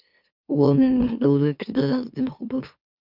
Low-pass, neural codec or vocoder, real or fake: 5.4 kHz; autoencoder, 44.1 kHz, a latent of 192 numbers a frame, MeloTTS; fake